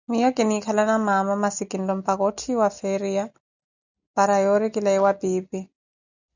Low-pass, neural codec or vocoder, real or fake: 7.2 kHz; none; real